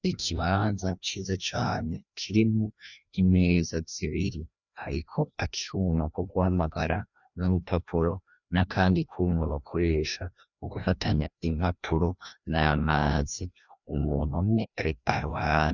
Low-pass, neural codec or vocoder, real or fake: 7.2 kHz; codec, 16 kHz, 1 kbps, FreqCodec, larger model; fake